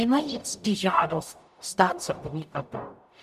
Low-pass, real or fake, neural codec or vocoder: 14.4 kHz; fake; codec, 44.1 kHz, 0.9 kbps, DAC